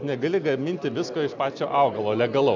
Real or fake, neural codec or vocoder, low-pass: real; none; 7.2 kHz